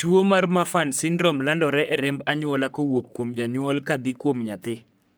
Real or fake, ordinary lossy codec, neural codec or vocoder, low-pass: fake; none; codec, 44.1 kHz, 3.4 kbps, Pupu-Codec; none